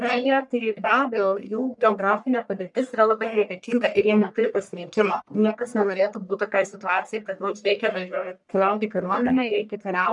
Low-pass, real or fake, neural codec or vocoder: 10.8 kHz; fake; codec, 44.1 kHz, 1.7 kbps, Pupu-Codec